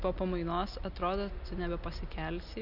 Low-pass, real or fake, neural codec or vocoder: 5.4 kHz; real; none